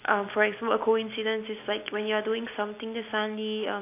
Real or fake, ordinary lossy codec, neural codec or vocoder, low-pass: real; AAC, 24 kbps; none; 3.6 kHz